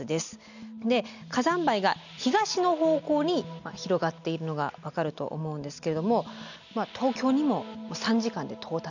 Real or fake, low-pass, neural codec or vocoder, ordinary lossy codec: real; 7.2 kHz; none; none